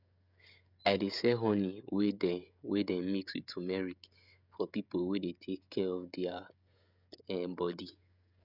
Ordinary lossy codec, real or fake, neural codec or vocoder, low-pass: none; fake; codec, 16 kHz, 16 kbps, FreqCodec, smaller model; 5.4 kHz